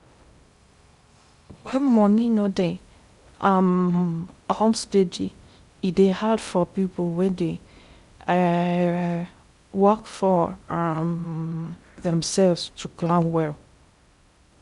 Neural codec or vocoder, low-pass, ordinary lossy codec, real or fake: codec, 16 kHz in and 24 kHz out, 0.6 kbps, FocalCodec, streaming, 2048 codes; 10.8 kHz; Opus, 64 kbps; fake